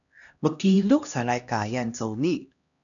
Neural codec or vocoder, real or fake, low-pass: codec, 16 kHz, 1 kbps, X-Codec, HuBERT features, trained on LibriSpeech; fake; 7.2 kHz